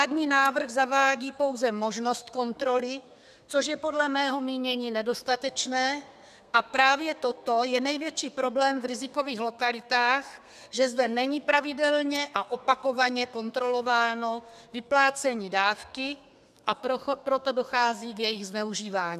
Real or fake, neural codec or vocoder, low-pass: fake; codec, 32 kHz, 1.9 kbps, SNAC; 14.4 kHz